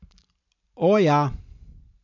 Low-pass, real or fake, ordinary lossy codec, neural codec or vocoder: 7.2 kHz; fake; none; vocoder, 44.1 kHz, 128 mel bands every 512 samples, BigVGAN v2